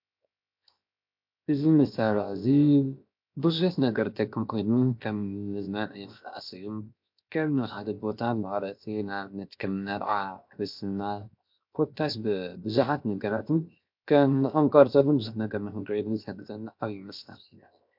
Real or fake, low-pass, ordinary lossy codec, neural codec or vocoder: fake; 5.4 kHz; MP3, 48 kbps; codec, 16 kHz, 0.7 kbps, FocalCodec